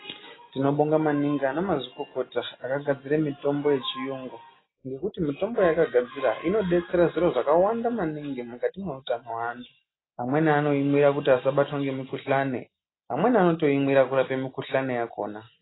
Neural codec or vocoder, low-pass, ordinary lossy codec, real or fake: none; 7.2 kHz; AAC, 16 kbps; real